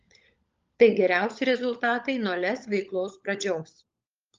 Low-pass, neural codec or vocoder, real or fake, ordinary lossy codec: 7.2 kHz; codec, 16 kHz, 16 kbps, FunCodec, trained on LibriTTS, 50 frames a second; fake; Opus, 32 kbps